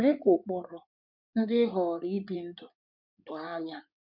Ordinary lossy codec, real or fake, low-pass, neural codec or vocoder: none; fake; 5.4 kHz; codec, 16 kHz in and 24 kHz out, 2.2 kbps, FireRedTTS-2 codec